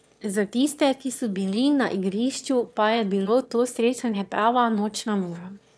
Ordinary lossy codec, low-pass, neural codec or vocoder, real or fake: none; none; autoencoder, 22.05 kHz, a latent of 192 numbers a frame, VITS, trained on one speaker; fake